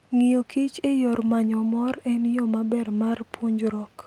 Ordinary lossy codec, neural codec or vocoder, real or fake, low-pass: Opus, 32 kbps; none; real; 19.8 kHz